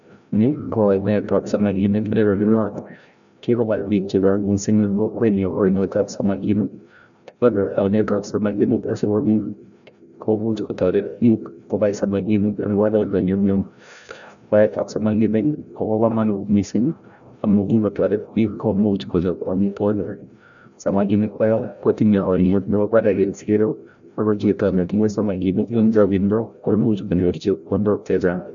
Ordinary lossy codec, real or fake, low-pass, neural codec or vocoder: none; fake; 7.2 kHz; codec, 16 kHz, 0.5 kbps, FreqCodec, larger model